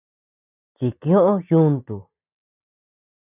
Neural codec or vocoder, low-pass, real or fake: none; 3.6 kHz; real